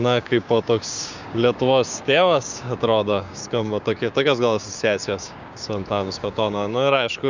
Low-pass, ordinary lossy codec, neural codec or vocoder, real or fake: 7.2 kHz; Opus, 64 kbps; codec, 16 kHz, 6 kbps, DAC; fake